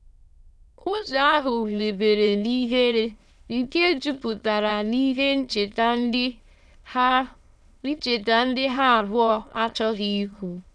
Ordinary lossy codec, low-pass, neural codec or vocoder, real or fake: none; none; autoencoder, 22.05 kHz, a latent of 192 numbers a frame, VITS, trained on many speakers; fake